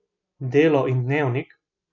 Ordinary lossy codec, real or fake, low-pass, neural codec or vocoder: none; real; 7.2 kHz; none